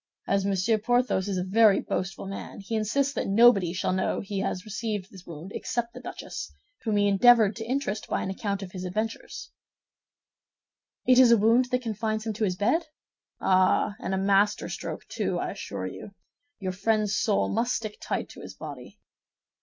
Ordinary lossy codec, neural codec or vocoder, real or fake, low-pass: MP3, 64 kbps; none; real; 7.2 kHz